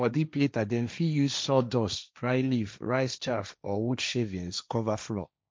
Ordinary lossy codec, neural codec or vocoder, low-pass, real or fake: none; codec, 16 kHz, 1.1 kbps, Voila-Tokenizer; none; fake